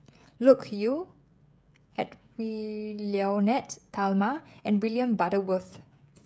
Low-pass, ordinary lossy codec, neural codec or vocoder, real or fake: none; none; codec, 16 kHz, 16 kbps, FreqCodec, smaller model; fake